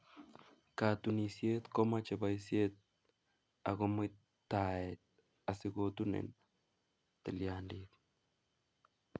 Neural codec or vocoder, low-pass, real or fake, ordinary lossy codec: none; none; real; none